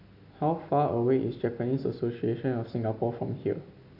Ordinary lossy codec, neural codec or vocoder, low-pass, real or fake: AAC, 48 kbps; none; 5.4 kHz; real